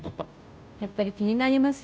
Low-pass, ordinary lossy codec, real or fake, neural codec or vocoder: none; none; fake; codec, 16 kHz, 0.5 kbps, FunCodec, trained on Chinese and English, 25 frames a second